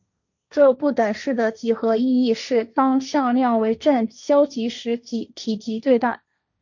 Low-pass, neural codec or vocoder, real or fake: 7.2 kHz; codec, 16 kHz, 1.1 kbps, Voila-Tokenizer; fake